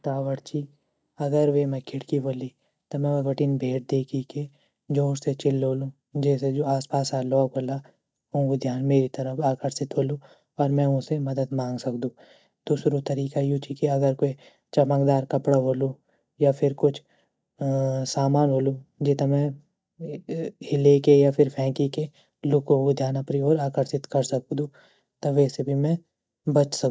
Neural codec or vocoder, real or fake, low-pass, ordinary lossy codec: none; real; none; none